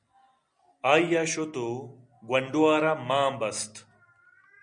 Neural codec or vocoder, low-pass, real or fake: none; 9.9 kHz; real